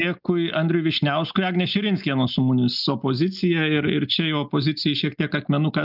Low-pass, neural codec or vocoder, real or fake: 5.4 kHz; none; real